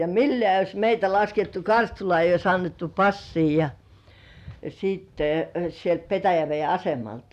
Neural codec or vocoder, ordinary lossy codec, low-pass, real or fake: none; none; 14.4 kHz; real